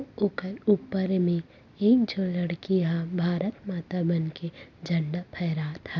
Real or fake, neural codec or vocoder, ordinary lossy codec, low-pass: real; none; none; 7.2 kHz